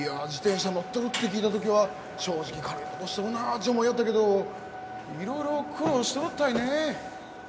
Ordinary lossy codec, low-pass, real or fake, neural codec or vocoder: none; none; real; none